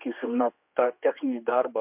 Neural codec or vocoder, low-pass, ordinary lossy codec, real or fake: codec, 16 kHz, 2 kbps, X-Codec, HuBERT features, trained on general audio; 3.6 kHz; MP3, 32 kbps; fake